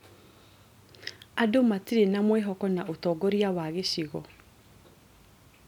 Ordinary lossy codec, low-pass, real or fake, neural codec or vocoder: none; 19.8 kHz; real; none